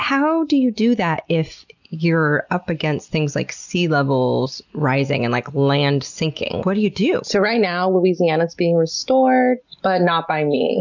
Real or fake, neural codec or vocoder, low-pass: real; none; 7.2 kHz